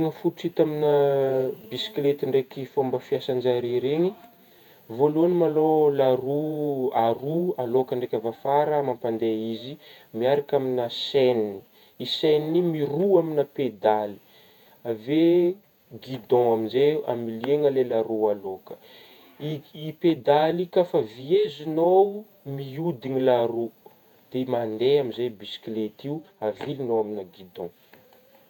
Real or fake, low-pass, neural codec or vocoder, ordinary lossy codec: fake; 19.8 kHz; vocoder, 48 kHz, 128 mel bands, Vocos; none